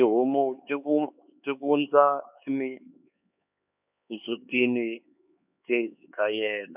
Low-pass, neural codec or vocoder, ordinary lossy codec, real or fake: 3.6 kHz; codec, 16 kHz, 4 kbps, X-Codec, HuBERT features, trained on LibriSpeech; none; fake